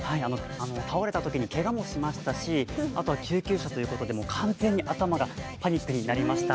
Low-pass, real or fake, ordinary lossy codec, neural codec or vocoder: none; real; none; none